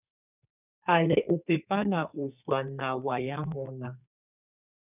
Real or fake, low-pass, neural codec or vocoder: fake; 3.6 kHz; codec, 32 kHz, 1.9 kbps, SNAC